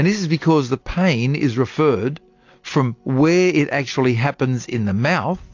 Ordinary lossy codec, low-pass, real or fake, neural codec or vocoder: AAC, 48 kbps; 7.2 kHz; real; none